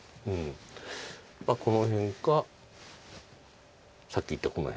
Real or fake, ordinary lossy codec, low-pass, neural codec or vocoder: real; none; none; none